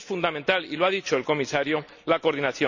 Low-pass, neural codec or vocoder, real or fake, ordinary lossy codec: 7.2 kHz; none; real; none